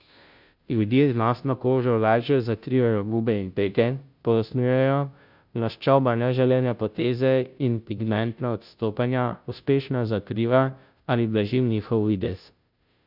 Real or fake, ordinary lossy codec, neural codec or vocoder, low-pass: fake; none; codec, 16 kHz, 0.5 kbps, FunCodec, trained on Chinese and English, 25 frames a second; 5.4 kHz